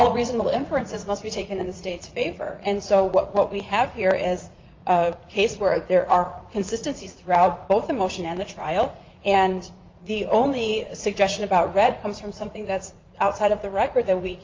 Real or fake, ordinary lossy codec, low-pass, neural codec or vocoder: fake; Opus, 24 kbps; 7.2 kHz; vocoder, 44.1 kHz, 80 mel bands, Vocos